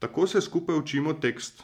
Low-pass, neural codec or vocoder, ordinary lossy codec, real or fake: 19.8 kHz; none; MP3, 96 kbps; real